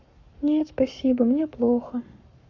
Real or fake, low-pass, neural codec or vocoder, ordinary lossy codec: fake; 7.2 kHz; codec, 44.1 kHz, 7.8 kbps, Pupu-Codec; none